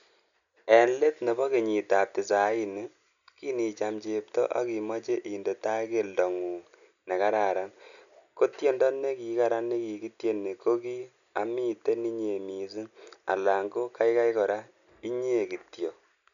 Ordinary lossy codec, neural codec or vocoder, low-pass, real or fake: none; none; 7.2 kHz; real